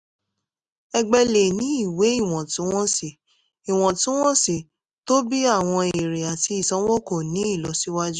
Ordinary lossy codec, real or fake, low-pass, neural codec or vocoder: none; real; 10.8 kHz; none